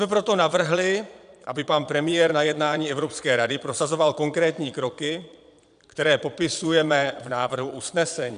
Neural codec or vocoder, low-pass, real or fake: vocoder, 22.05 kHz, 80 mel bands, Vocos; 9.9 kHz; fake